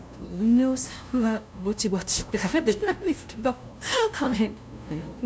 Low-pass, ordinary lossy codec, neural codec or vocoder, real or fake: none; none; codec, 16 kHz, 0.5 kbps, FunCodec, trained on LibriTTS, 25 frames a second; fake